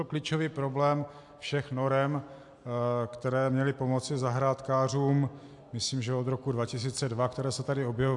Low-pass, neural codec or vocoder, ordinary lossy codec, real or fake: 10.8 kHz; none; AAC, 64 kbps; real